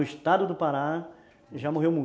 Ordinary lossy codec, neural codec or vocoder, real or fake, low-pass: none; none; real; none